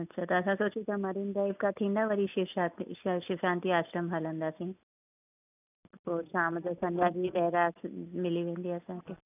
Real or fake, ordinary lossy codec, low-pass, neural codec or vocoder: real; none; 3.6 kHz; none